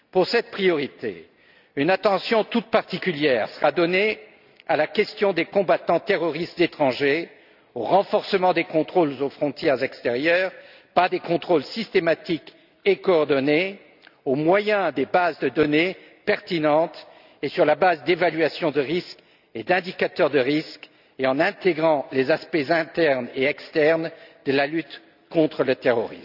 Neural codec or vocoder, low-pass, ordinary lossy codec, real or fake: none; 5.4 kHz; none; real